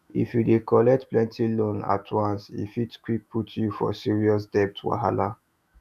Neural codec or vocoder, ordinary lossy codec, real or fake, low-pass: autoencoder, 48 kHz, 128 numbers a frame, DAC-VAE, trained on Japanese speech; none; fake; 14.4 kHz